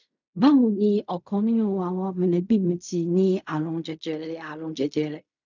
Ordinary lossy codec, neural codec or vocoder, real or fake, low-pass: none; codec, 16 kHz in and 24 kHz out, 0.4 kbps, LongCat-Audio-Codec, fine tuned four codebook decoder; fake; 7.2 kHz